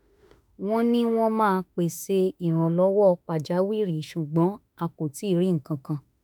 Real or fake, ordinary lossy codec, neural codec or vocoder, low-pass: fake; none; autoencoder, 48 kHz, 32 numbers a frame, DAC-VAE, trained on Japanese speech; none